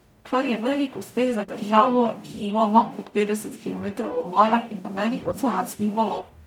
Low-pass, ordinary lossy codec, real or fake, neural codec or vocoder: 19.8 kHz; none; fake; codec, 44.1 kHz, 0.9 kbps, DAC